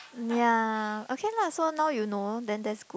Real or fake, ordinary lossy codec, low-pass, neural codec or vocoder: real; none; none; none